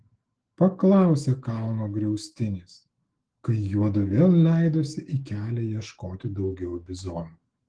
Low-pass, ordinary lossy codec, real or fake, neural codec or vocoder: 9.9 kHz; Opus, 16 kbps; fake; autoencoder, 48 kHz, 128 numbers a frame, DAC-VAE, trained on Japanese speech